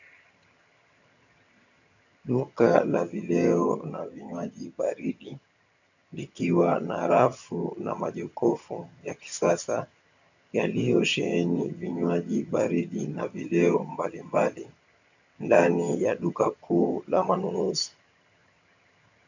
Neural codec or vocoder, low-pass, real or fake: vocoder, 22.05 kHz, 80 mel bands, HiFi-GAN; 7.2 kHz; fake